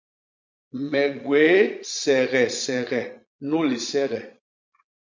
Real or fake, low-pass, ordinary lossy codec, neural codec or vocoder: fake; 7.2 kHz; MP3, 64 kbps; vocoder, 22.05 kHz, 80 mel bands, Vocos